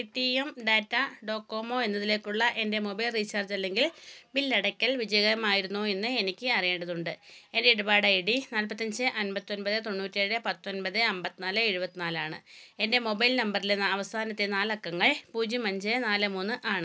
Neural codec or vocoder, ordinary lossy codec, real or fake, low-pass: none; none; real; none